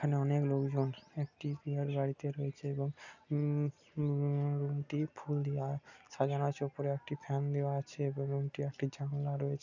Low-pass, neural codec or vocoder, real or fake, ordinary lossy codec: 7.2 kHz; none; real; none